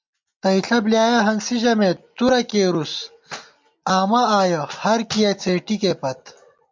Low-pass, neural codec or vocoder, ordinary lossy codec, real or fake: 7.2 kHz; none; MP3, 64 kbps; real